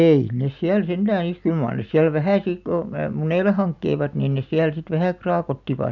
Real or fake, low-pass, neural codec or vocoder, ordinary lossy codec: real; 7.2 kHz; none; none